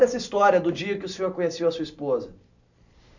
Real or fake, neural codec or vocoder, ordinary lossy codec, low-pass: real; none; none; 7.2 kHz